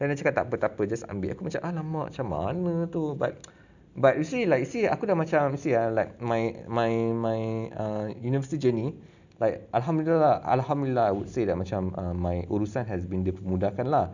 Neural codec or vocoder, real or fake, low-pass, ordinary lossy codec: none; real; 7.2 kHz; none